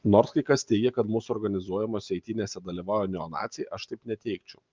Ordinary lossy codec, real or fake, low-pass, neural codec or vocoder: Opus, 32 kbps; real; 7.2 kHz; none